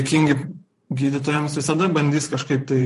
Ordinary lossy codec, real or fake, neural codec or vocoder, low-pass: MP3, 48 kbps; fake; vocoder, 44.1 kHz, 128 mel bands every 512 samples, BigVGAN v2; 14.4 kHz